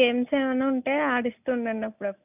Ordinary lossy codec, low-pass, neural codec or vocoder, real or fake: none; 3.6 kHz; none; real